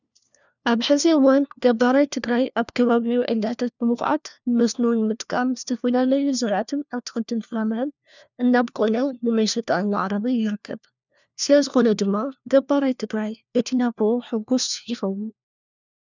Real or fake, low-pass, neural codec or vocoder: fake; 7.2 kHz; codec, 16 kHz, 1 kbps, FunCodec, trained on LibriTTS, 50 frames a second